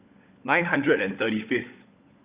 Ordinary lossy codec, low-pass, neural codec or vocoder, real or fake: Opus, 64 kbps; 3.6 kHz; codec, 16 kHz, 16 kbps, FunCodec, trained on LibriTTS, 50 frames a second; fake